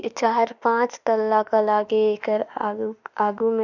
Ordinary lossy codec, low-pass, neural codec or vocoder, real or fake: none; 7.2 kHz; autoencoder, 48 kHz, 32 numbers a frame, DAC-VAE, trained on Japanese speech; fake